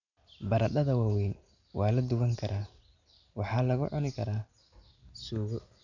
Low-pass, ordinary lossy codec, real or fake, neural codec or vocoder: 7.2 kHz; none; real; none